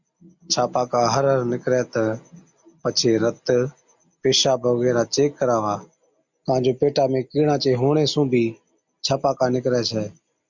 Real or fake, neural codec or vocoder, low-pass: real; none; 7.2 kHz